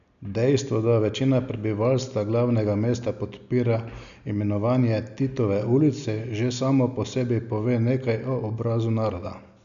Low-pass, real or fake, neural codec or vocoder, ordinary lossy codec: 7.2 kHz; real; none; none